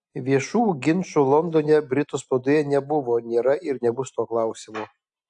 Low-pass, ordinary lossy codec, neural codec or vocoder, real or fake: 10.8 kHz; AAC, 64 kbps; none; real